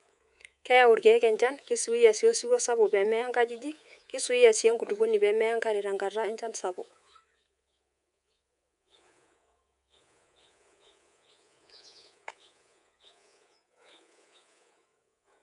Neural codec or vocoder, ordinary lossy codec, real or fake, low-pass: codec, 24 kHz, 3.1 kbps, DualCodec; none; fake; 10.8 kHz